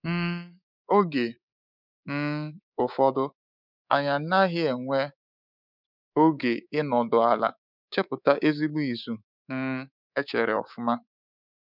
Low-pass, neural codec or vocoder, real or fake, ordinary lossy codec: 5.4 kHz; codec, 24 kHz, 3.1 kbps, DualCodec; fake; none